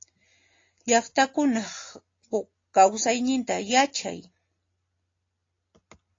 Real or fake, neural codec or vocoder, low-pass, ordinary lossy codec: real; none; 7.2 kHz; AAC, 32 kbps